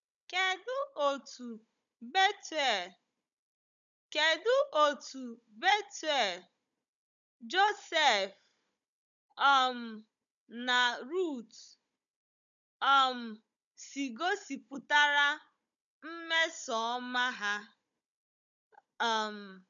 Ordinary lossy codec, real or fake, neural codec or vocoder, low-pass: none; fake; codec, 16 kHz, 16 kbps, FunCodec, trained on Chinese and English, 50 frames a second; 7.2 kHz